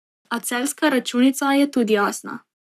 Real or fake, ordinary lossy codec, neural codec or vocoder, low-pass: fake; none; codec, 44.1 kHz, 7.8 kbps, Pupu-Codec; 14.4 kHz